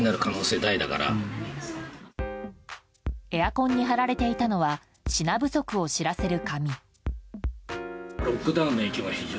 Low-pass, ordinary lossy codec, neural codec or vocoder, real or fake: none; none; none; real